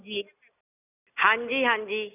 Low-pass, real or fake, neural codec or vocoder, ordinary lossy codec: 3.6 kHz; real; none; none